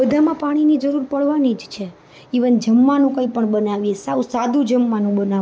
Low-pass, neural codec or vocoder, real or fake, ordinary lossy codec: none; none; real; none